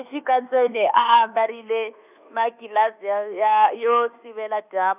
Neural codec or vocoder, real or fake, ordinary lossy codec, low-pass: codec, 24 kHz, 1.2 kbps, DualCodec; fake; none; 3.6 kHz